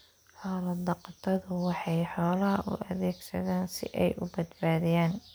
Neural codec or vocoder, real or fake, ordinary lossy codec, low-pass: none; real; none; none